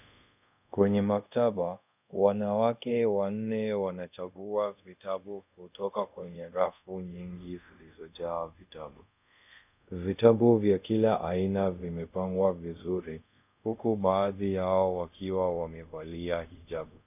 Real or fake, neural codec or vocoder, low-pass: fake; codec, 24 kHz, 0.5 kbps, DualCodec; 3.6 kHz